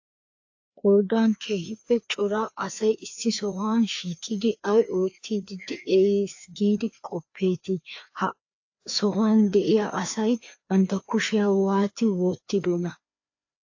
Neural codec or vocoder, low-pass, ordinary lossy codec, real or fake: codec, 16 kHz, 2 kbps, FreqCodec, larger model; 7.2 kHz; AAC, 48 kbps; fake